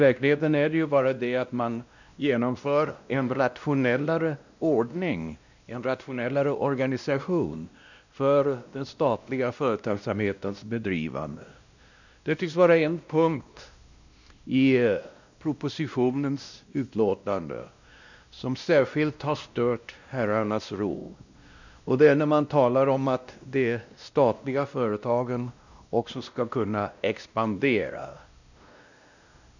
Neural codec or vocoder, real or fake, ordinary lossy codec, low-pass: codec, 16 kHz, 1 kbps, X-Codec, WavLM features, trained on Multilingual LibriSpeech; fake; none; 7.2 kHz